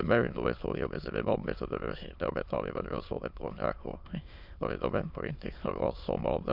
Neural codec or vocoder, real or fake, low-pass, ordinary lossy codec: autoencoder, 22.05 kHz, a latent of 192 numbers a frame, VITS, trained on many speakers; fake; 5.4 kHz; none